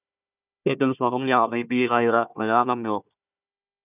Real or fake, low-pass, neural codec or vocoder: fake; 3.6 kHz; codec, 16 kHz, 1 kbps, FunCodec, trained on Chinese and English, 50 frames a second